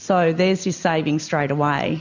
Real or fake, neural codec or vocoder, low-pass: real; none; 7.2 kHz